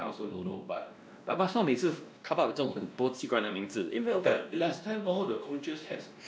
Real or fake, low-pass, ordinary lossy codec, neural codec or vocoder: fake; none; none; codec, 16 kHz, 1 kbps, X-Codec, WavLM features, trained on Multilingual LibriSpeech